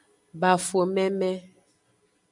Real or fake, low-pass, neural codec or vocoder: real; 10.8 kHz; none